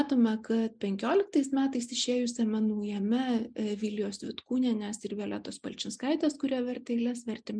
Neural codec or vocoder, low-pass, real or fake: none; 9.9 kHz; real